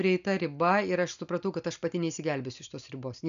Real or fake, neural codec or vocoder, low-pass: real; none; 7.2 kHz